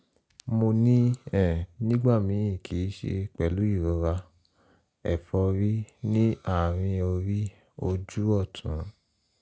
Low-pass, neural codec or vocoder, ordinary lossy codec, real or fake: none; none; none; real